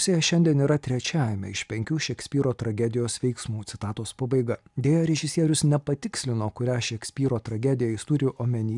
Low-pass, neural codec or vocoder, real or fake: 10.8 kHz; none; real